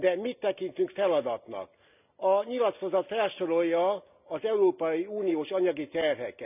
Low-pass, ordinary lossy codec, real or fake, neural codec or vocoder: 3.6 kHz; none; real; none